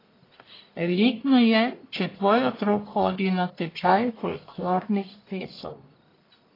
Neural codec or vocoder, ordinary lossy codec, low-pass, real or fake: codec, 44.1 kHz, 1.7 kbps, Pupu-Codec; AAC, 24 kbps; 5.4 kHz; fake